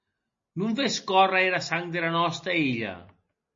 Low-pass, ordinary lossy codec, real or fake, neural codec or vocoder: 7.2 kHz; MP3, 32 kbps; real; none